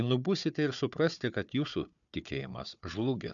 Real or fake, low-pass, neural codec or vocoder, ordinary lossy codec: fake; 7.2 kHz; codec, 16 kHz, 4 kbps, FunCodec, trained on Chinese and English, 50 frames a second; MP3, 96 kbps